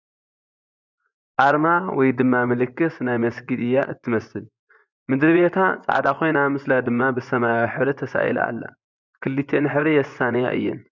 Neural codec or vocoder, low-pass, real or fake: vocoder, 24 kHz, 100 mel bands, Vocos; 7.2 kHz; fake